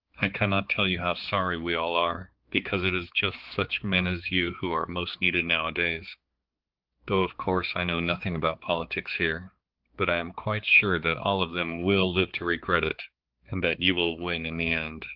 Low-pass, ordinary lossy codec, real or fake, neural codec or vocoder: 5.4 kHz; Opus, 16 kbps; fake; codec, 16 kHz, 4 kbps, X-Codec, HuBERT features, trained on balanced general audio